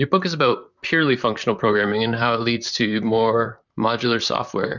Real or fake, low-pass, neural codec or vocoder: fake; 7.2 kHz; vocoder, 44.1 kHz, 128 mel bands, Pupu-Vocoder